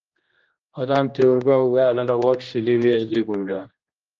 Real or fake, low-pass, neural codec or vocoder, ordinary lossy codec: fake; 7.2 kHz; codec, 16 kHz, 1 kbps, X-Codec, HuBERT features, trained on general audio; Opus, 24 kbps